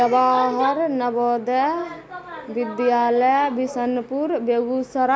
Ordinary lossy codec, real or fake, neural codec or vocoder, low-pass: none; real; none; none